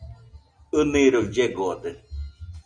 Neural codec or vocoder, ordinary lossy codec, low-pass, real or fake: none; Opus, 64 kbps; 9.9 kHz; real